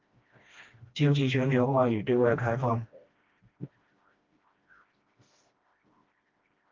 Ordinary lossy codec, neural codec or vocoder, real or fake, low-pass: Opus, 32 kbps; codec, 16 kHz, 1 kbps, FreqCodec, smaller model; fake; 7.2 kHz